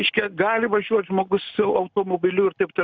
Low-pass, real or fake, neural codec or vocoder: 7.2 kHz; real; none